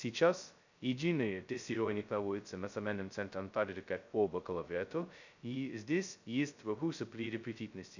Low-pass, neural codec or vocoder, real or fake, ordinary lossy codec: 7.2 kHz; codec, 16 kHz, 0.2 kbps, FocalCodec; fake; none